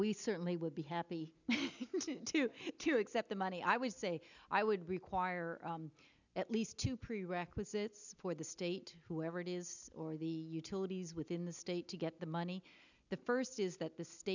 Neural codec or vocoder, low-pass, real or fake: none; 7.2 kHz; real